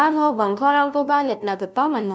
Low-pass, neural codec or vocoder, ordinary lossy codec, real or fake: none; codec, 16 kHz, 0.5 kbps, FunCodec, trained on LibriTTS, 25 frames a second; none; fake